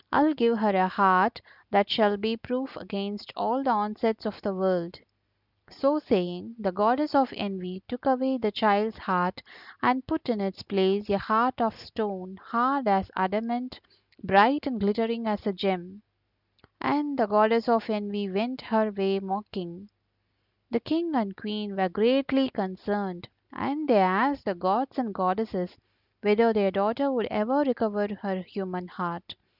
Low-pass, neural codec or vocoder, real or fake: 5.4 kHz; none; real